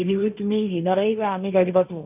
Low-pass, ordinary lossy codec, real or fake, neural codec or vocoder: 3.6 kHz; none; fake; codec, 16 kHz, 1.1 kbps, Voila-Tokenizer